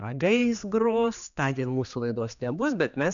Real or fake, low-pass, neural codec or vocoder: fake; 7.2 kHz; codec, 16 kHz, 2 kbps, X-Codec, HuBERT features, trained on general audio